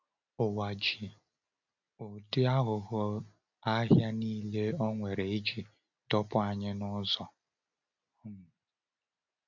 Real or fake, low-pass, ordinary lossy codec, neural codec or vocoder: real; 7.2 kHz; none; none